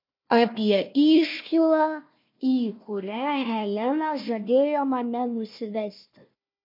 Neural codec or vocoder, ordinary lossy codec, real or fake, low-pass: codec, 16 kHz, 1 kbps, FunCodec, trained on Chinese and English, 50 frames a second; MP3, 32 kbps; fake; 5.4 kHz